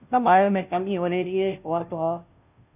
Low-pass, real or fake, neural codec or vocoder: 3.6 kHz; fake; codec, 16 kHz, 0.5 kbps, FunCodec, trained on Chinese and English, 25 frames a second